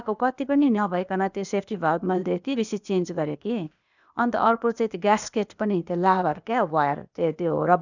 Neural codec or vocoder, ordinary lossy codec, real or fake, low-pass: codec, 16 kHz, 0.8 kbps, ZipCodec; none; fake; 7.2 kHz